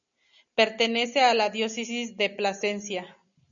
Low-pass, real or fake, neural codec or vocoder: 7.2 kHz; real; none